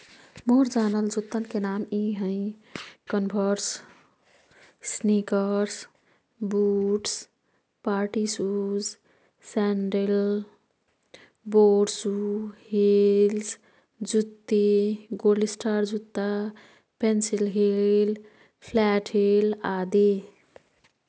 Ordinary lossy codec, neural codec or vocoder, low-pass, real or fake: none; none; none; real